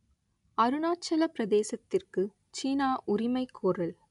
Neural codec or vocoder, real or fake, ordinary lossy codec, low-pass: none; real; none; 10.8 kHz